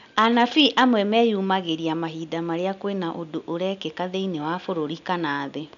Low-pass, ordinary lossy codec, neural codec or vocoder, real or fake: 7.2 kHz; none; none; real